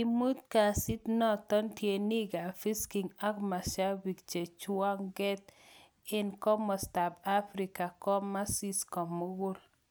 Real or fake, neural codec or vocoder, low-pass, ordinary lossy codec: real; none; none; none